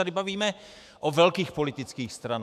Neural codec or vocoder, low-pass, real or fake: autoencoder, 48 kHz, 128 numbers a frame, DAC-VAE, trained on Japanese speech; 14.4 kHz; fake